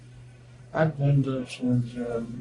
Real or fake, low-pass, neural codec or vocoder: fake; 10.8 kHz; codec, 44.1 kHz, 1.7 kbps, Pupu-Codec